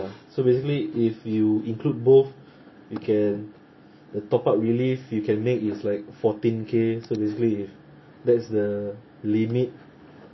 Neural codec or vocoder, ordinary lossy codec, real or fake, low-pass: none; MP3, 24 kbps; real; 7.2 kHz